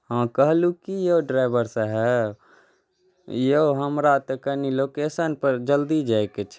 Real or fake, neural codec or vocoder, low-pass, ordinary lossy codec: real; none; none; none